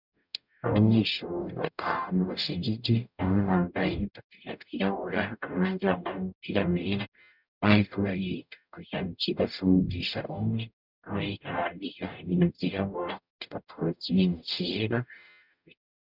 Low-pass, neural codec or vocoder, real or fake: 5.4 kHz; codec, 44.1 kHz, 0.9 kbps, DAC; fake